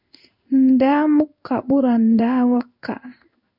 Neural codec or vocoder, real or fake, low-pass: codec, 16 kHz in and 24 kHz out, 1 kbps, XY-Tokenizer; fake; 5.4 kHz